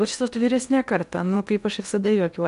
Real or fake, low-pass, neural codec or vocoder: fake; 10.8 kHz; codec, 16 kHz in and 24 kHz out, 0.8 kbps, FocalCodec, streaming, 65536 codes